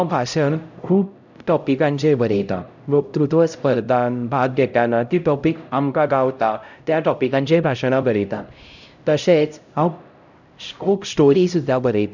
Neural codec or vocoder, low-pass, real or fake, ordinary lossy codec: codec, 16 kHz, 0.5 kbps, X-Codec, HuBERT features, trained on LibriSpeech; 7.2 kHz; fake; none